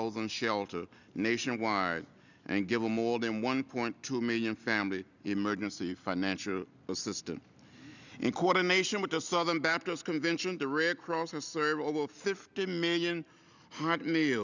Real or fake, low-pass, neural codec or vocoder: real; 7.2 kHz; none